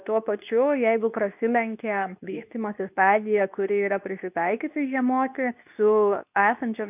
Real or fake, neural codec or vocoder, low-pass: fake; codec, 24 kHz, 0.9 kbps, WavTokenizer, medium speech release version 2; 3.6 kHz